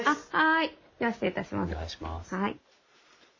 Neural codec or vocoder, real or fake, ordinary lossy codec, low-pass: none; real; MP3, 32 kbps; 7.2 kHz